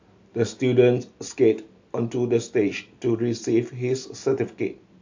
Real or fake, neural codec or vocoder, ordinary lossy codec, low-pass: real; none; AAC, 48 kbps; 7.2 kHz